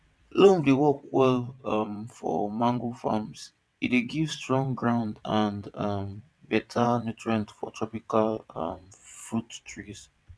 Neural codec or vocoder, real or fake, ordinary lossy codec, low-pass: vocoder, 22.05 kHz, 80 mel bands, WaveNeXt; fake; none; none